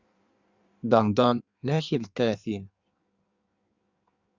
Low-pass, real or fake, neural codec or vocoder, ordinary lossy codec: 7.2 kHz; fake; codec, 16 kHz in and 24 kHz out, 1.1 kbps, FireRedTTS-2 codec; Opus, 64 kbps